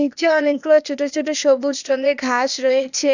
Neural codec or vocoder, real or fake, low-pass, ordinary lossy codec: codec, 16 kHz, 0.8 kbps, ZipCodec; fake; 7.2 kHz; none